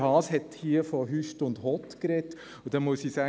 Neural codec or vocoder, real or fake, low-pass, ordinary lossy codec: none; real; none; none